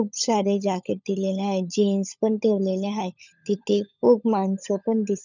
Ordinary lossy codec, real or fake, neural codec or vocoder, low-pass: none; fake; codec, 16 kHz, 16 kbps, FunCodec, trained on LibriTTS, 50 frames a second; 7.2 kHz